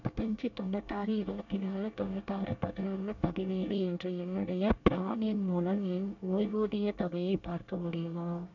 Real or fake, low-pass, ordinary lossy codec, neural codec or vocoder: fake; 7.2 kHz; none; codec, 24 kHz, 1 kbps, SNAC